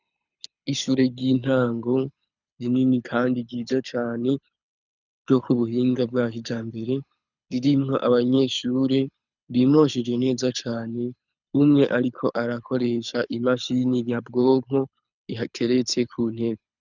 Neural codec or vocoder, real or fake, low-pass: codec, 24 kHz, 6 kbps, HILCodec; fake; 7.2 kHz